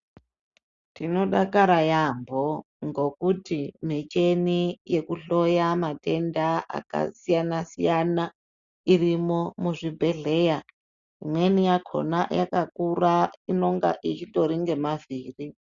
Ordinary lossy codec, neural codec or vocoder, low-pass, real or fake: Opus, 64 kbps; none; 7.2 kHz; real